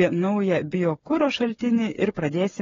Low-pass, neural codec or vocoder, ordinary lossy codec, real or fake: 7.2 kHz; codec, 16 kHz, 8 kbps, FreqCodec, smaller model; AAC, 24 kbps; fake